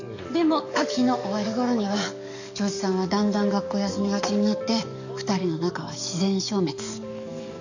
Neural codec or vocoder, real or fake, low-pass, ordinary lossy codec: codec, 44.1 kHz, 7.8 kbps, DAC; fake; 7.2 kHz; none